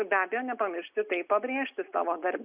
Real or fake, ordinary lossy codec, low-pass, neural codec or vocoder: real; Opus, 64 kbps; 3.6 kHz; none